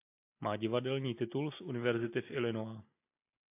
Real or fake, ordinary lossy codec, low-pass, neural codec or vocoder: real; AAC, 24 kbps; 3.6 kHz; none